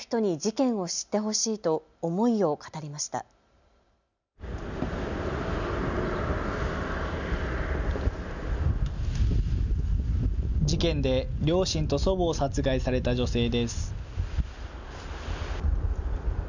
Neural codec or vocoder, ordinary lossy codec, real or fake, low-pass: none; none; real; 7.2 kHz